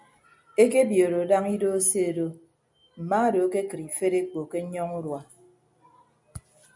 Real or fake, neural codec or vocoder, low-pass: real; none; 10.8 kHz